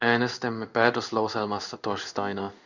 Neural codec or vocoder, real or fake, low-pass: codec, 16 kHz in and 24 kHz out, 1 kbps, XY-Tokenizer; fake; 7.2 kHz